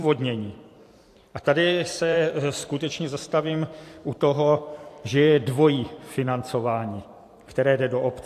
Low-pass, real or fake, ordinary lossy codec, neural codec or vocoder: 14.4 kHz; fake; AAC, 64 kbps; vocoder, 44.1 kHz, 128 mel bands every 512 samples, BigVGAN v2